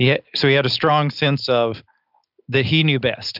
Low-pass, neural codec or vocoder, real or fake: 5.4 kHz; none; real